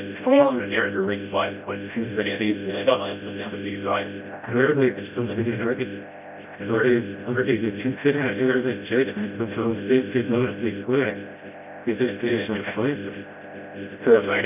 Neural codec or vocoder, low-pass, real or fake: codec, 16 kHz, 0.5 kbps, FreqCodec, smaller model; 3.6 kHz; fake